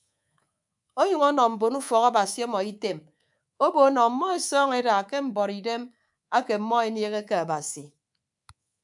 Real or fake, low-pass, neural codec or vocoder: fake; 10.8 kHz; codec, 24 kHz, 3.1 kbps, DualCodec